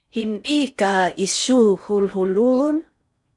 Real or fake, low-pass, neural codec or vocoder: fake; 10.8 kHz; codec, 16 kHz in and 24 kHz out, 0.6 kbps, FocalCodec, streaming, 4096 codes